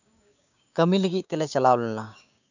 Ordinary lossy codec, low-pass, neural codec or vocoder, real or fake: none; 7.2 kHz; codec, 16 kHz, 6 kbps, DAC; fake